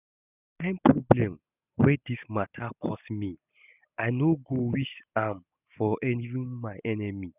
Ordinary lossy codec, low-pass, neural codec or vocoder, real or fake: none; 3.6 kHz; none; real